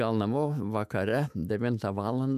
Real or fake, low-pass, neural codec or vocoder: fake; 14.4 kHz; autoencoder, 48 kHz, 128 numbers a frame, DAC-VAE, trained on Japanese speech